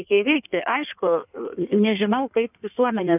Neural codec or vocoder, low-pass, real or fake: codec, 16 kHz in and 24 kHz out, 1.1 kbps, FireRedTTS-2 codec; 3.6 kHz; fake